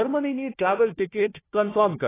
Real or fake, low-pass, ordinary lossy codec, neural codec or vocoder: fake; 3.6 kHz; AAC, 16 kbps; codec, 16 kHz, 1 kbps, FunCodec, trained on Chinese and English, 50 frames a second